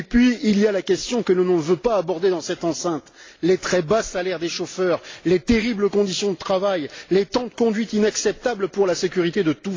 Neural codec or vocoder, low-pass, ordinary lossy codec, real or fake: none; 7.2 kHz; AAC, 32 kbps; real